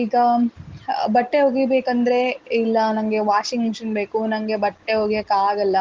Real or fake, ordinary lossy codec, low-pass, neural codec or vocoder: real; Opus, 16 kbps; 7.2 kHz; none